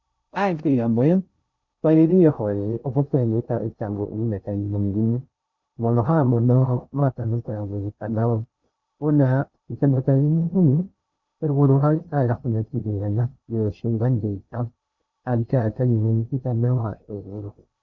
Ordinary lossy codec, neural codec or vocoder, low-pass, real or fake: Opus, 64 kbps; codec, 16 kHz in and 24 kHz out, 0.6 kbps, FocalCodec, streaming, 2048 codes; 7.2 kHz; fake